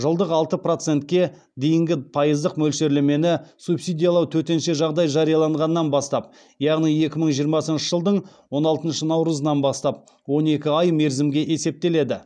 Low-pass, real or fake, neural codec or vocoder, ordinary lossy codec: 9.9 kHz; real; none; none